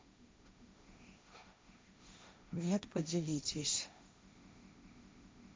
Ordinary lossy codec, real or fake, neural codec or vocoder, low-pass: none; fake; codec, 16 kHz, 1.1 kbps, Voila-Tokenizer; none